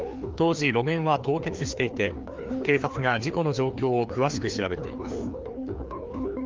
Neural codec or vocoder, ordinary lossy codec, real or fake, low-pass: codec, 16 kHz, 2 kbps, FreqCodec, larger model; Opus, 32 kbps; fake; 7.2 kHz